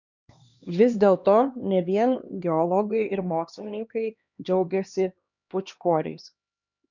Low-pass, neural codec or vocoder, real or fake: 7.2 kHz; codec, 16 kHz, 2 kbps, X-Codec, HuBERT features, trained on LibriSpeech; fake